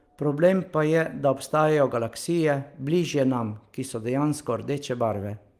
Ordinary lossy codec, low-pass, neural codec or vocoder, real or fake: Opus, 24 kbps; 14.4 kHz; none; real